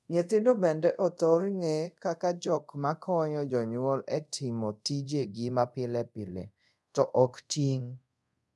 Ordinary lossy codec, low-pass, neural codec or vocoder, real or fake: none; none; codec, 24 kHz, 0.5 kbps, DualCodec; fake